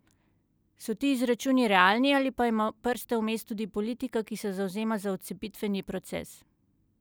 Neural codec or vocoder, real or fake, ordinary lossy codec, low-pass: none; real; none; none